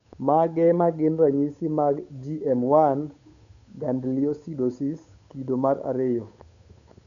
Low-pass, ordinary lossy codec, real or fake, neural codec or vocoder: 7.2 kHz; none; fake; codec, 16 kHz, 8 kbps, FunCodec, trained on Chinese and English, 25 frames a second